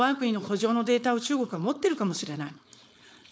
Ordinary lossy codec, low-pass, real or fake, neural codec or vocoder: none; none; fake; codec, 16 kHz, 4.8 kbps, FACodec